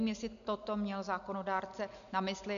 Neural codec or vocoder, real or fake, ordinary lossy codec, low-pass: none; real; MP3, 96 kbps; 7.2 kHz